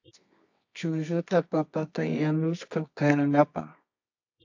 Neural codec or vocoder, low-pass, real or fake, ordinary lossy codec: codec, 24 kHz, 0.9 kbps, WavTokenizer, medium music audio release; 7.2 kHz; fake; AAC, 48 kbps